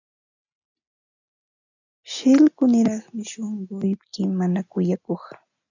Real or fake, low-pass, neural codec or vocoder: real; 7.2 kHz; none